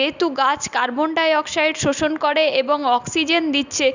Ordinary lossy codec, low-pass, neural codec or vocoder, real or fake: none; 7.2 kHz; none; real